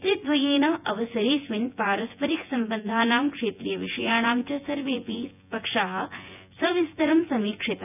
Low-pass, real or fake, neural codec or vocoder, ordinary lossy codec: 3.6 kHz; fake; vocoder, 24 kHz, 100 mel bands, Vocos; none